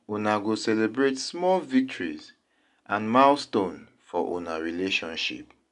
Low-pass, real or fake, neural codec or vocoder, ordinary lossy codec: 10.8 kHz; real; none; none